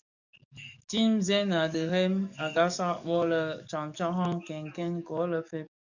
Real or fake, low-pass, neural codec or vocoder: fake; 7.2 kHz; codec, 44.1 kHz, 7.8 kbps, DAC